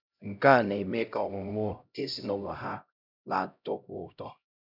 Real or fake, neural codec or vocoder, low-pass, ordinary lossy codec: fake; codec, 16 kHz, 0.5 kbps, X-Codec, HuBERT features, trained on LibriSpeech; 5.4 kHz; none